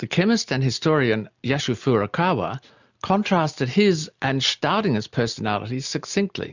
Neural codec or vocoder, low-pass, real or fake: none; 7.2 kHz; real